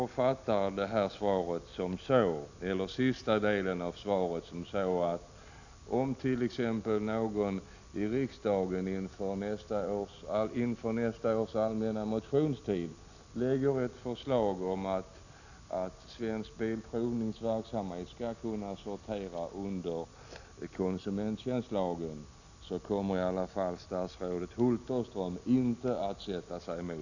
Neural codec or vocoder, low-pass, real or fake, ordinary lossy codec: none; 7.2 kHz; real; none